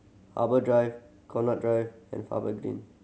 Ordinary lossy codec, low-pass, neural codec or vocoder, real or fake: none; none; none; real